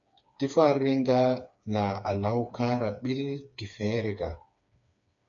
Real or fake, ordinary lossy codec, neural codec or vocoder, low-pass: fake; AAC, 64 kbps; codec, 16 kHz, 4 kbps, FreqCodec, smaller model; 7.2 kHz